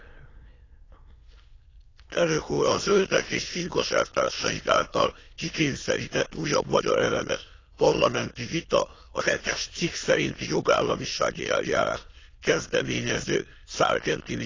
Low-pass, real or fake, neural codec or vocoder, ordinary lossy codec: 7.2 kHz; fake; autoencoder, 22.05 kHz, a latent of 192 numbers a frame, VITS, trained on many speakers; AAC, 32 kbps